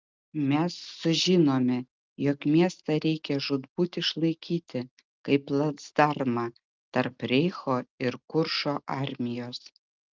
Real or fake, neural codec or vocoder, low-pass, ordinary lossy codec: real; none; 7.2 kHz; Opus, 24 kbps